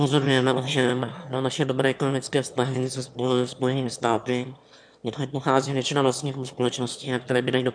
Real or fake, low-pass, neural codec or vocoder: fake; 9.9 kHz; autoencoder, 22.05 kHz, a latent of 192 numbers a frame, VITS, trained on one speaker